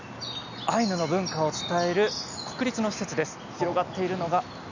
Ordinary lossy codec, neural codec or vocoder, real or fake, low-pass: none; none; real; 7.2 kHz